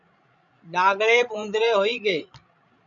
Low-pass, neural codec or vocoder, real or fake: 7.2 kHz; codec, 16 kHz, 16 kbps, FreqCodec, larger model; fake